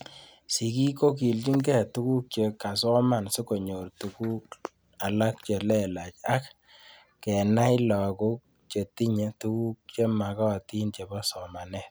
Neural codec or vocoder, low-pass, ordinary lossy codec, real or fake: none; none; none; real